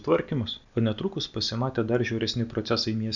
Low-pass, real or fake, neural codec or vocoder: 7.2 kHz; real; none